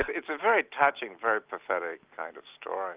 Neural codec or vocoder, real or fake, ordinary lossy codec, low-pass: none; real; Opus, 24 kbps; 3.6 kHz